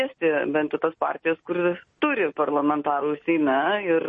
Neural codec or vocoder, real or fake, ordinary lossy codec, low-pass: none; real; MP3, 32 kbps; 10.8 kHz